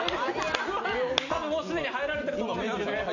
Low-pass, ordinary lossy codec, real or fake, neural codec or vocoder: 7.2 kHz; none; real; none